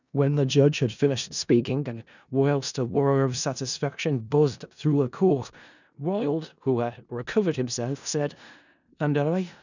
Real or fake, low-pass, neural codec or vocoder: fake; 7.2 kHz; codec, 16 kHz in and 24 kHz out, 0.4 kbps, LongCat-Audio-Codec, four codebook decoder